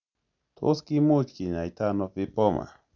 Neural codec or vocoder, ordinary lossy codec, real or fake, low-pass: none; none; real; 7.2 kHz